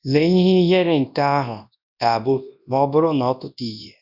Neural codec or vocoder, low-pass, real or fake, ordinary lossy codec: codec, 24 kHz, 0.9 kbps, WavTokenizer, large speech release; 5.4 kHz; fake; none